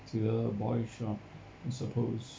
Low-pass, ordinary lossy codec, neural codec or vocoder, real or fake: none; none; none; real